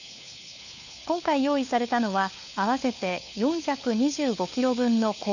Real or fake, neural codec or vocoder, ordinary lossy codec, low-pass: fake; codec, 16 kHz, 4 kbps, FunCodec, trained on LibriTTS, 50 frames a second; none; 7.2 kHz